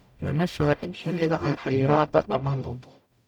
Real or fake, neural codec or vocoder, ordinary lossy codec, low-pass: fake; codec, 44.1 kHz, 0.9 kbps, DAC; none; 19.8 kHz